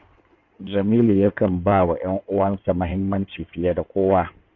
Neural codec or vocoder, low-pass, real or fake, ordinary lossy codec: codec, 16 kHz in and 24 kHz out, 2.2 kbps, FireRedTTS-2 codec; 7.2 kHz; fake; none